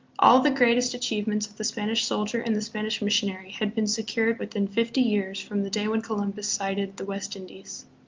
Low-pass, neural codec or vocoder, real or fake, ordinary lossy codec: 7.2 kHz; none; real; Opus, 32 kbps